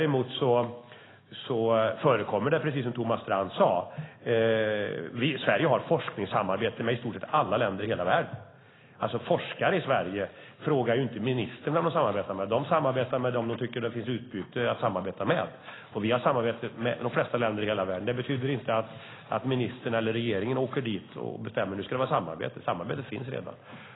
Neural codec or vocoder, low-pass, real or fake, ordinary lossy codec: none; 7.2 kHz; real; AAC, 16 kbps